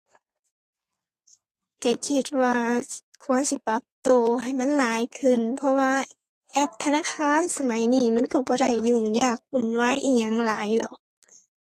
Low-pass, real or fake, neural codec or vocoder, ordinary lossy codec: 14.4 kHz; fake; codec, 32 kHz, 1.9 kbps, SNAC; AAC, 48 kbps